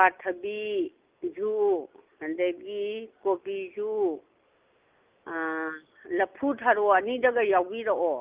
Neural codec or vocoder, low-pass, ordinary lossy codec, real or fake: none; 3.6 kHz; Opus, 32 kbps; real